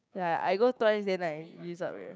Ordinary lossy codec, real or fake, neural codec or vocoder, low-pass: none; fake; codec, 16 kHz, 6 kbps, DAC; none